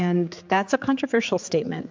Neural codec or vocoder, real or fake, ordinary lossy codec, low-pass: codec, 16 kHz, 4 kbps, X-Codec, HuBERT features, trained on general audio; fake; MP3, 64 kbps; 7.2 kHz